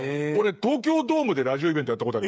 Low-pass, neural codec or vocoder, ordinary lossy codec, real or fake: none; codec, 16 kHz, 8 kbps, FreqCodec, smaller model; none; fake